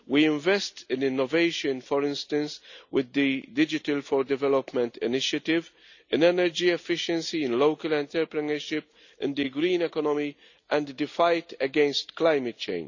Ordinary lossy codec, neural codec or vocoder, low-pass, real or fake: none; none; 7.2 kHz; real